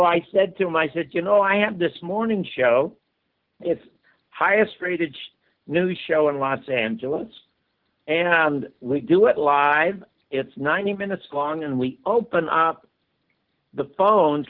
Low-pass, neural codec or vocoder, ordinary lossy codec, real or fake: 5.4 kHz; none; Opus, 16 kbps; real